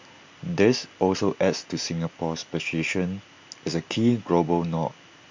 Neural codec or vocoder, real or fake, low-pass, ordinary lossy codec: none; real; 7.2 kHz; MP3, 48 kbps